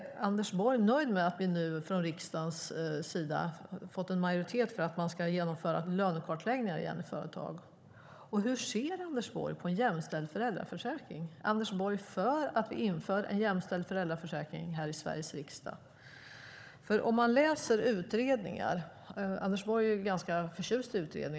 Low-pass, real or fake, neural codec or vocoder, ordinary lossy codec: none; fake; codec, 16 kHz, 16 kbps, FunCodec, trained on Chinese and English, 50 frames a second; none